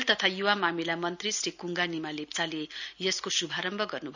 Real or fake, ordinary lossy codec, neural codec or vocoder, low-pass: real; none; none; 7.2 kHz